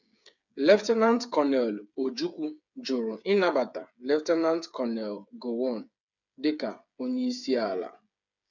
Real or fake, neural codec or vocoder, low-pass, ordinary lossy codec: fake; codec, 16 kHz, 8 kbps, FreqCodec, smaller model; 7.2 kHz; none